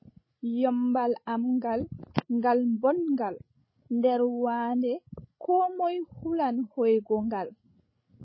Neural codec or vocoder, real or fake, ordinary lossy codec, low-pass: codec, 16 kHz, 16 kbps, FreqCodec, larger model; fake; MP3, 24 kbps; 7.2 kHz